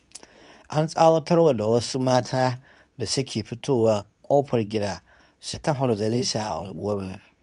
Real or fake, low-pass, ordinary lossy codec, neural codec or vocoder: fake; 10.8 kHz; none; codec, 24 kHz, 0.9 kbps, WavTokenizer, medium speech release version 2